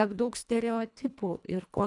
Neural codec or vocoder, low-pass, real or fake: codec, 24 kHz, 1.5 kbps, HILCodec; 10.8 kHz; fake